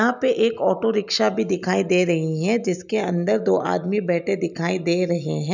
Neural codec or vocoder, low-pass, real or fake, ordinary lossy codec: none; 7.2 kHz; real; none